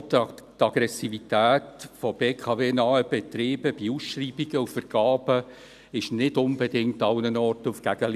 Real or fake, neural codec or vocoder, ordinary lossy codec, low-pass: real; none; none; 14.4 kHz